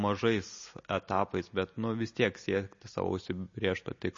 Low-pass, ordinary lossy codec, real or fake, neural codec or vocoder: 7.2 kHz; MP3, 32 kbps; real; none